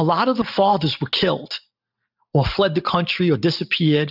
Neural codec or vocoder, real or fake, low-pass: none; real; 5.4 kHz